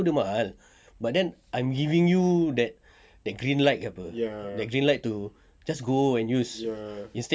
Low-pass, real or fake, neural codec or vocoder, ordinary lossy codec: none; real; none; none